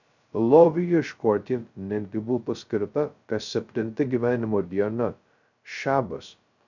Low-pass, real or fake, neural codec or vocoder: 7.2 kHz; fake; codec, 16 kHz, 0.2 kbps, FocalCodec